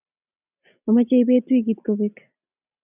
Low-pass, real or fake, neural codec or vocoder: 3.6 kHz; real; none